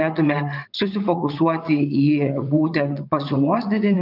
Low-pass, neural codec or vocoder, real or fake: 5.4 kHz; vocoder, 22.05 kHz, 80 mel bands, WaveNeXt; fake